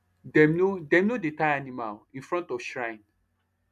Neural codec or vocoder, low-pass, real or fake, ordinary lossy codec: none; 14.4 kHz; real; none